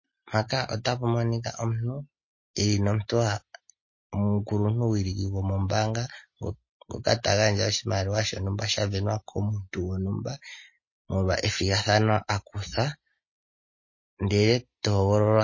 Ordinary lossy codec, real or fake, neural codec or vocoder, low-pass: MP3, 32 kbps; real; none; 7.2 kHz